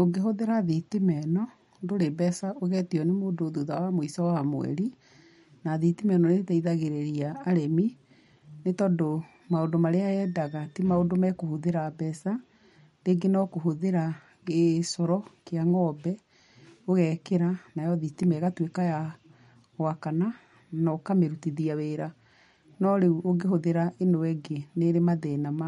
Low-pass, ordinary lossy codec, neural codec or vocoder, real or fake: 19.8 kHz; MP3, 48 kbps; none; real